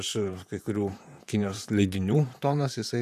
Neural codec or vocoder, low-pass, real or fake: vocoder, 44.1 kHz, 128 mel bands, Pupu-Vocoder; 14.4 kHz; fake